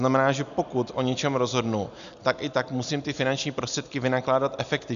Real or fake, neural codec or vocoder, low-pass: real; none; 7.2 kHz